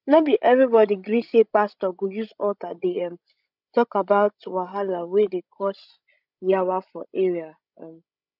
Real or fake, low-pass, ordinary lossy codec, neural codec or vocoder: fake; 5.4 kHz; none; codec, 16 kHz, 16 kbps, FreqCodec, larger model